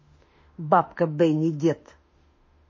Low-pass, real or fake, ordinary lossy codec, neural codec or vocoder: 7.2 kHz; fake; MP3, 32 kbps; autoencoder, 48 kHz, 32 numbers a frame, DAC-VAE, trained on Japanese speech